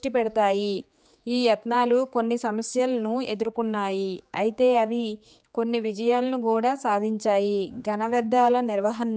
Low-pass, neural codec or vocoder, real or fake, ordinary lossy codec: none; codec, 16 kHz, 4 kbps, X-Codec, HuBERT features, trained on general audio; fake; none